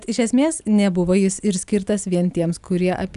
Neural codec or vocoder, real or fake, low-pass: none; real; 10.8 kHz